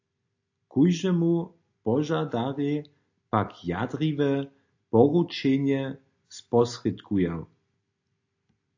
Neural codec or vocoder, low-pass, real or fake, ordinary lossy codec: none; 7.2 kHz; real; AAC, 48 kbps